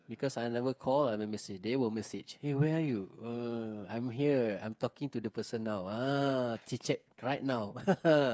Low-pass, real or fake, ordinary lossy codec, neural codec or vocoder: none; fake; none; codec, 16 kHz, 8 kbps, FreqCodec, smaller model